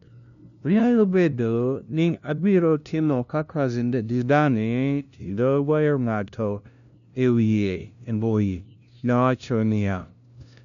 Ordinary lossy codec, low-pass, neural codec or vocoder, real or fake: none; 7.2 kHz; codec, 16 kHz, 0.5 kbps, FunCodec, trained on LibriTTS, 25 frames a second; fake